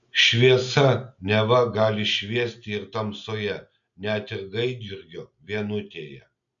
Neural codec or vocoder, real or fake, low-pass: none; real; 7.2 kHz